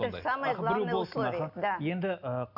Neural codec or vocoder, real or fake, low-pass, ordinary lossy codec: none; real; 5.4 kHz; none